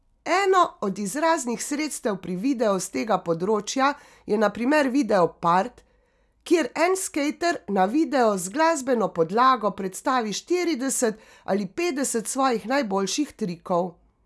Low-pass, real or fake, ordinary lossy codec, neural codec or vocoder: none; real; none; none